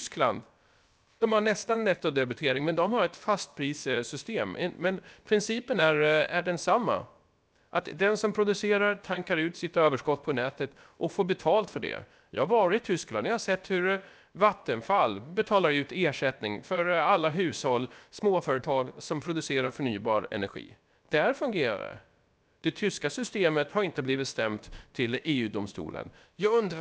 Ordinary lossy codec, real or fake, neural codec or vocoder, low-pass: none; fake; codec, 16 kHz, about 1 kbps, DyCAST, with the encoder's durations; none